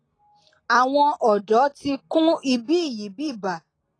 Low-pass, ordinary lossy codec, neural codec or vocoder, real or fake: 9.9 kHz; AAC, 32 kbps; none; real